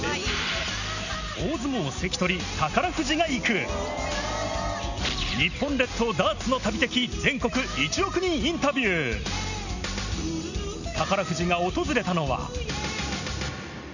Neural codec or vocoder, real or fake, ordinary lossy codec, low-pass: none; real; none; 7.2 kHz